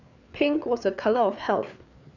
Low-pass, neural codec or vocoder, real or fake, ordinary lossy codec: 7.2 kHz; codec, 16 kHz, 4 kbps, FreqCodec, larger model; fake; none